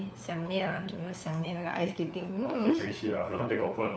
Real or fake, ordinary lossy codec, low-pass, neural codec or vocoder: fake; none; none; codec, 16 kHz, 4 kbps, FunCodec, trained on LibriTTS, 50 frames a second